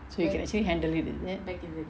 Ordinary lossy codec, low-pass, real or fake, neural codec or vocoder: none; none; real; none